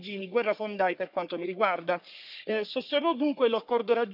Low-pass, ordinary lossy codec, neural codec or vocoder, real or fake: 5.4 kHz; none; codec, 44.1 kHz, 3.4 kbps, Pupu-Codec; fake